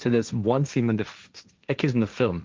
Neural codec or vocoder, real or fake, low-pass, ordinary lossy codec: codec, 16 kHz, 1.1 kbps, Voila-Tokenizer; fake; 7.2 kHz; Opus, 24 kbps